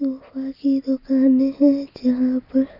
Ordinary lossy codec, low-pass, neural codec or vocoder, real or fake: none; 5.4 kHz; none; real